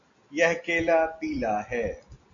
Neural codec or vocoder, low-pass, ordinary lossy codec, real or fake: none; 7.2 kHz; MP3, 64 kbps; real